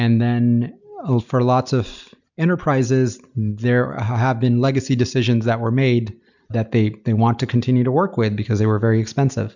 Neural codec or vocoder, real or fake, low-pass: none; real; 7.2 kHz